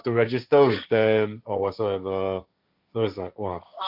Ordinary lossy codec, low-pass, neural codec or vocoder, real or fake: MP3, 48 kbps; 5.4 kHz; codec, 16 kHz, 1.1 kbps, Voila-Tokenizer; fake